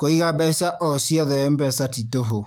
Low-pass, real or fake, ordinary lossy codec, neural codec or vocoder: 19.8 kHz; fake; none; codec, 44.1 kHz, 7.8 kbps, DAC